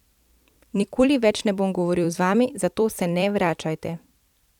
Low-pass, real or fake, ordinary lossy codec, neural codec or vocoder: 19.8 kHz; fake; none; vocoder, 44.1 kHz, 128 mel bands every 256 samples, BigVGAN v2